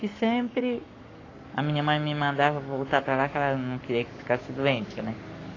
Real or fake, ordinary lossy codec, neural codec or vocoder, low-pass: fake; AAC, 32 kbps; codec, 44.1 kHz, 7.8 kbps, Pupu-Codec; 7.2 kHz